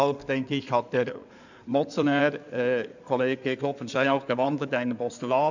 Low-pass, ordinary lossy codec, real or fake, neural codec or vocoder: 7.2 kHz; none; fake; codec, 16 kHz in and 24 kHz out, 2.2 kbps, FireRedTTS-2 codec